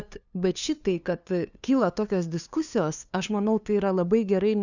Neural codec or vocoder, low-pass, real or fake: codec, 16 kHz, 2 kbps, FunCodec, trained on LibriTTS, 25 frames a second; 7.2 kHz; fake